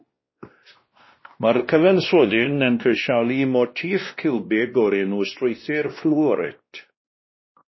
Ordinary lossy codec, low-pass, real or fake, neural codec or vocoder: MP3, 24 kbps; 7.2 kHz; fake; codec, 16 kHz, 1 kbps, X-Codec, WavLM features, trained on Multilingual LibriSpeech